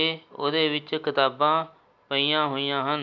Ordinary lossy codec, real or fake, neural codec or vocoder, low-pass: none; real; none; 7.2 kHz